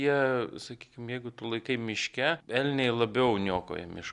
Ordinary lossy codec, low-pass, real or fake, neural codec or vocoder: Opus, 64 kbps; 10.8 kHz; real; none